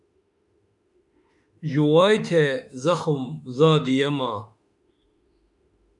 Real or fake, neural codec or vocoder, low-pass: fake; autoencoder, 48 kHz, 32 numbers a frame, DAC-VAE, trained on Japanese speech; 10.8 kHz